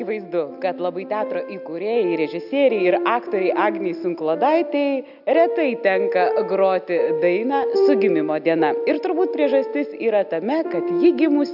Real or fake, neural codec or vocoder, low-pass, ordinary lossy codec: real; none; 5.4 kHz; AAC, 48 kbps